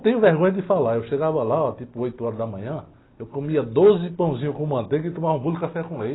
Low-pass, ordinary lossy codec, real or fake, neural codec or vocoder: 7.2 kHz; AAC, 16 kbps; real; none